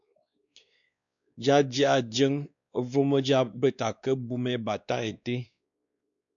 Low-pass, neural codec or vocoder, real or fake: 7.2 kHz; codec, 16 kHz, 2 kbps, X-Codec, WavLM features, trained on Multilingual LibriSpeech; fake